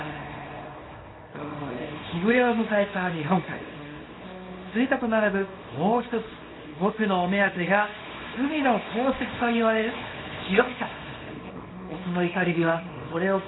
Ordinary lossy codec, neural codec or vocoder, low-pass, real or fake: AAC, 16 kbps; codec, 24 kHz, 0.9 kbps, WavTokenizer, small release; 7.2 kHz; fake